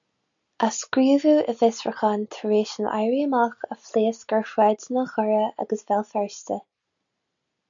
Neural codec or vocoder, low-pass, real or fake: none; 7.2 kHz; real